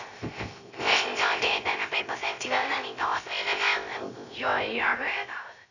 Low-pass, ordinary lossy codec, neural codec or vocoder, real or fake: 7.2 kHz; none; codec, 16 kHz, 0.3 kbps, FocalCodec; fake